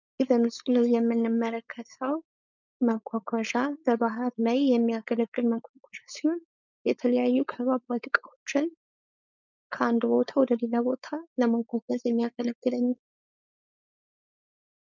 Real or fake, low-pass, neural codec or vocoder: fake; 7.2 kHz; codec, 16 kHz, 4.8 kbps, FACodec